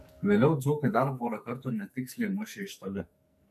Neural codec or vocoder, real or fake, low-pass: codec, 44.1 kHz, 2.6 kbps, SNAC; fake; 14.4 kHz